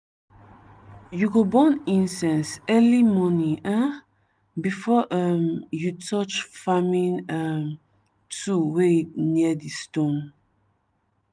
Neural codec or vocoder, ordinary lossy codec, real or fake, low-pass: none; none; real; none